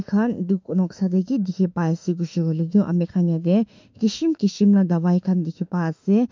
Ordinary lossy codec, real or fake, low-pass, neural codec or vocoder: MP3, 64 kbps; fake; 7.2 kHz; autoencoder, 48 kHz, 32 numbers a frame, DAC-VAE, trained on Japanese speech